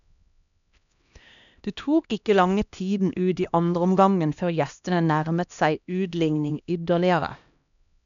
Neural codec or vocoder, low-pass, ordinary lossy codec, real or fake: codec, 16 kHz, 1 kbps, X-Codec, HuBERT features, trained on LibriSpeech; 7.2 kHz; none; fake